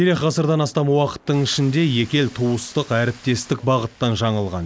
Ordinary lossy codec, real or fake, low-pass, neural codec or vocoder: none; real; none; none